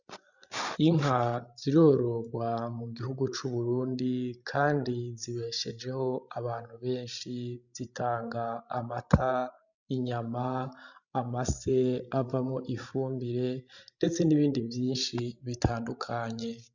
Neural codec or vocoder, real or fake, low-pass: codec, 16 kHz, 16 kbps, FreqCodec, larger model; fake; 7.2 kHz